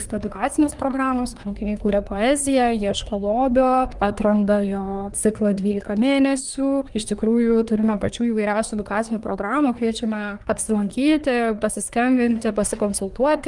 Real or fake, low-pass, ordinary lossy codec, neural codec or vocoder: fake; 10.8 kHz; Opus, 32 kbps; codec, 24 kHz, 1 kbps, SNAC